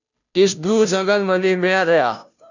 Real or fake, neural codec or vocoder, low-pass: fake; codec, 16 kHz, 0.5 kbps, FunCodec, trained on Chinese and English, 25 frames a second; 7.2 kHz